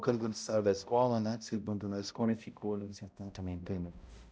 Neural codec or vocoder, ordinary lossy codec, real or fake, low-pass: codec, 16 kHz, 0.5 kbps, X-Codec, HuBERT features, trained on balanced general audio; none; fake; none